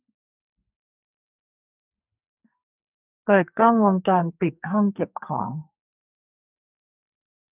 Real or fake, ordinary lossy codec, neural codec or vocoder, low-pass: fake; none; codec, 44.1 kHz, 2.6 kbps, SNAC; 3.6 kHz